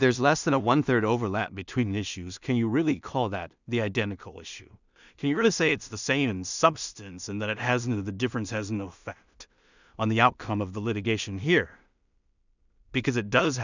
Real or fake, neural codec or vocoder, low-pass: fake; codec, 16 kHz in and 24 kHz out, 0.4 kbps, LongCat-Audio-Codec, two codebook decoder; 7.2 kHz